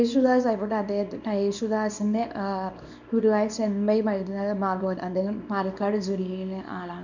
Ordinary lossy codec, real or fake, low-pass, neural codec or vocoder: none; fake; 7.2 kHz; codec, 24 kHz, 0.9 kbps, WavTokenizer, small release